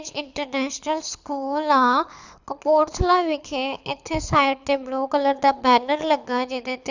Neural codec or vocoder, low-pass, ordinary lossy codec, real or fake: codec, 24 kHz, 6 kbps, HILCodec; 7.2 kHz; none; fake